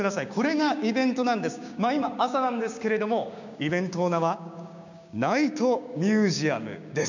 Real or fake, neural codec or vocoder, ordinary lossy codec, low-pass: fake; codec, 16 kHz, 6 kbps, DAC; none; 7.2 kHz